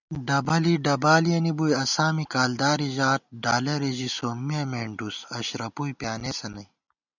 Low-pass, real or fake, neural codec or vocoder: 7.2 kHz; real; none